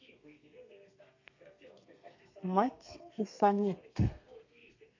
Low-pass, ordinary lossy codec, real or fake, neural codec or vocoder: 7.2 kHz; AAC, 48 kbps; fake; codec, 32 kHz, 1.9 kbps, SNAC